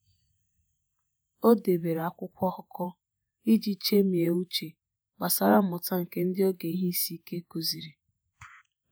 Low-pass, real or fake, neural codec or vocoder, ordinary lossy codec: none; fake; vocoder, 48 kHz, 128 mel bands, Vocos; none